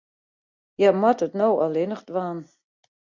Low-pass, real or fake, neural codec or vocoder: 7.2 kHz; real; none